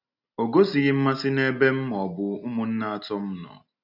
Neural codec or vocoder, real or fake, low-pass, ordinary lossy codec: none; real; 5.4 kHz; none